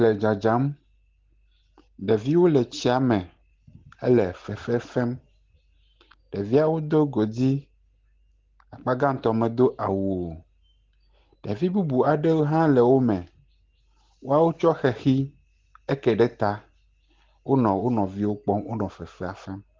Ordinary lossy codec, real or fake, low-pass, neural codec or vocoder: Opus, 16 kbps; real; 7.2 kHz; none